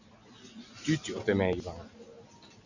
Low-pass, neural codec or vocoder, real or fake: 7.2 kHz; none; real